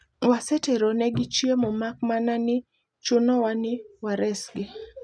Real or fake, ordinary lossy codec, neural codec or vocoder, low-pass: real; none; none; none